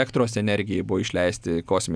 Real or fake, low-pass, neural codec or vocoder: real; 9.9 kHz; none